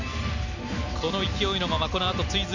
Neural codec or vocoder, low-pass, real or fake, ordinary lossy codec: none; 7.2 kHz; real; none